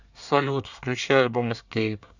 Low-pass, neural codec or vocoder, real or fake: 7.2 kHz; codec, 24 kHz, 1 kbps, SNAC; fake